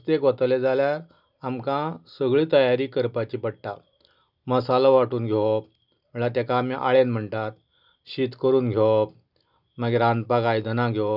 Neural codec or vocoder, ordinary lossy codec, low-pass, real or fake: none; none; 5.4 kHz; real